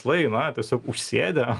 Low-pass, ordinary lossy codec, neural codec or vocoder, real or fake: 10.8 kHz; Opus, 32 kbps; none; real